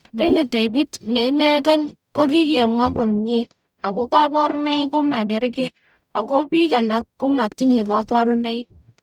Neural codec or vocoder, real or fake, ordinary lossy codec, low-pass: codec, 44.1 kHz, 0.9 kbps, DAC; fake; none; 19.8 kHz